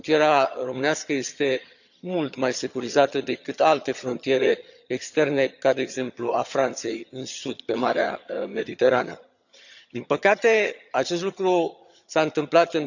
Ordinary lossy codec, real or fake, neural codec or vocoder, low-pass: none; fake; vocoder, 22.05 kHz, 80 mel bands, HiFi-GAN; 7.2 kHz